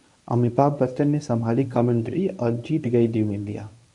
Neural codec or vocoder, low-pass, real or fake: codec, 24 kHz, 0.9 kbps, WavTokenizer, medium speech release version 1; 10.8 kHz; fake